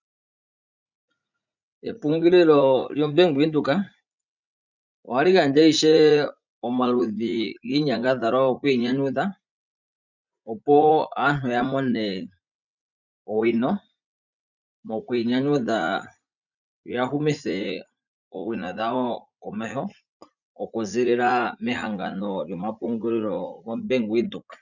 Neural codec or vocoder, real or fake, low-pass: vocoder, 44.1 kHz, 80 mel bands, Vocos; fake; 7.2 kHz